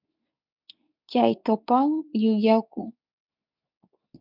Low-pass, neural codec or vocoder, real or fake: 5.4 kHz; codec, 24 kHz, 0.9 kbps, WavTokenizer, medium speech release version 2; fake